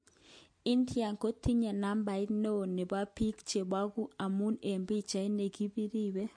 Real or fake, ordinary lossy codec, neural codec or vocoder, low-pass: real; MP3, 48 kbps; none; 9.9 kHz